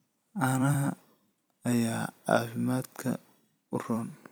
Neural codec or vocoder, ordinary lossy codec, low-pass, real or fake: vocoder, 44.1 kHz, 128 mel bands every 256 samples, BigVGAN v2; none; none; fake